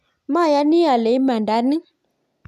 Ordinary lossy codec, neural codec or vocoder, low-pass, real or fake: MP3, 96 kbps; none; 14.4 kHz; real